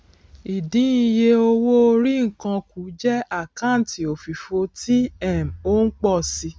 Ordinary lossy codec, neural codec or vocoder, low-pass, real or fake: none; none; none; real